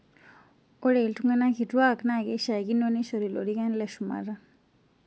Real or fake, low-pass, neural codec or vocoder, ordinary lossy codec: real; none; none; none